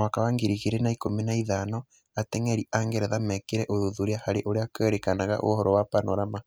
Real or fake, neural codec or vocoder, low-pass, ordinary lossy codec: real; none; none; none